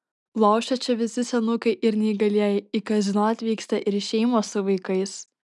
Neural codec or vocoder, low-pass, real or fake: none; 10.8 kHz; real